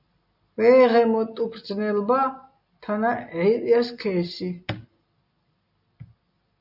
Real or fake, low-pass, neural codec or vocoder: real; 5.4 kHz; none